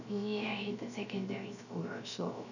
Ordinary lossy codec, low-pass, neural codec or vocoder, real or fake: none; 7.2 kHz; codec, 16 kHz, 0.3 kbps, FocalCodec; fake